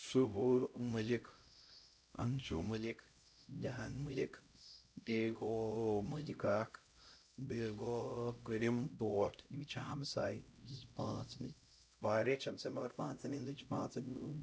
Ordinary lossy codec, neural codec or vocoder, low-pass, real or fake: none; codec, 16 kHz, 0.5 kbps, X-Codec, HuBERT features, trained on LibriSpeech; none; fake